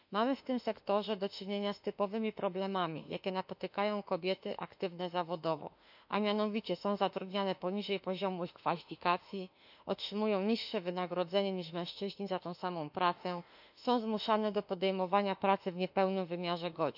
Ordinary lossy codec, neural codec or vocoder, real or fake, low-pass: none; autoencoder, 48 kHz, 32 numbers a frame, DAC-VAE, trained on Japanese speech; fake; 5.4 kHz